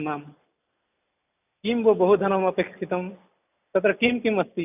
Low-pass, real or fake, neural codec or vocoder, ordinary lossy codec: 3.6 kHz; real; none; none